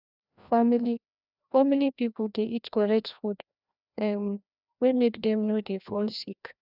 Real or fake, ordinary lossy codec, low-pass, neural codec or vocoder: fake; none; 5.4 kHz; codec, 16 kHz, 1 kbps, FreqCodec, larger model